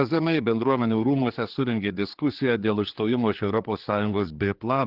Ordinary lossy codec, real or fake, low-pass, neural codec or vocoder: Opus, 16 kbps; fake; 5.4 kHz; codec, 16 kHz, 4 kbps, X-Codec, HuBERT features, trained on general audio